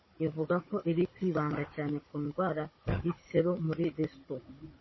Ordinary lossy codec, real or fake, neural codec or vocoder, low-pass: MP3, 24 kbps; fake; codec, 16 kHz, 16 kbps, FunCodec, trained on Chinese and English, 50 frames a second; 7.2 kHz